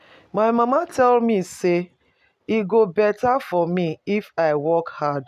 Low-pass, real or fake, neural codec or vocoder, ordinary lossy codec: 14.4 kHz; real; none; none